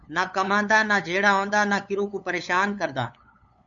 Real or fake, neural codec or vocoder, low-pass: fake; codec, 16 kHz, 8 kbps, FunCodec, trained on LibriTTS, 25 frames a second; 7.2 kHz